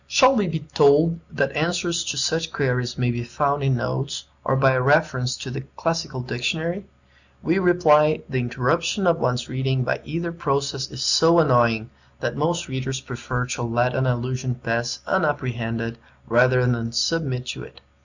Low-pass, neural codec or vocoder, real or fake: 7.2 kHz; none; real